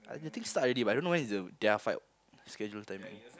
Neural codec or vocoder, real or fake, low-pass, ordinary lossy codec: none; real; none; none